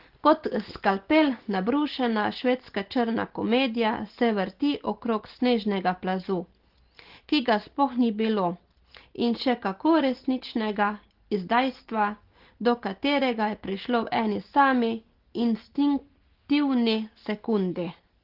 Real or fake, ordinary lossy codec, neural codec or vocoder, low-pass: real; Opus, 16 kbps; none; 5.4 kHz